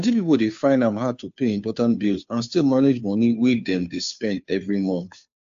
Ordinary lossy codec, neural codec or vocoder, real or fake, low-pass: AAC, 64 kbps; codec, 16 kHz, 2 kbps, FunCodec, trained on Chinese and English, 25 frames a second; fake; 7.2 kHz